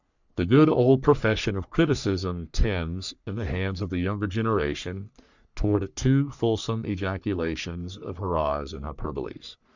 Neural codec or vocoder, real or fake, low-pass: codec, 44.1 kHz, 3.4 kbps, Pupu-Codec; fake; 7.2 kHz